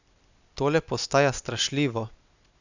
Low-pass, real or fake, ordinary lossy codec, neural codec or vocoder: 7.2 kHz; real; none; none